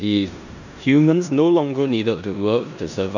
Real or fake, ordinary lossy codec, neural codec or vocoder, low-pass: fake; none; codec, 16 kHz in and 24 kHz out, 0.9 kbps, LongCat-Audio-Codec, four codebook decoder; 7.2 kHz